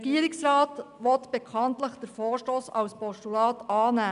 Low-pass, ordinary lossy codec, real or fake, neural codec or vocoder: 10.8 kHz; none; real; none